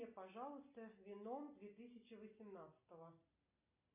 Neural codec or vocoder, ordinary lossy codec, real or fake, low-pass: none; MP3, 32 kbps; real; 3.6 kHz